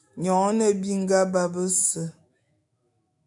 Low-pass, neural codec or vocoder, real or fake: 10.8 kHz; autoencoder, 48 kHz, 128 numbers a frame, DAC-VAE, trained on Japanese speech; fake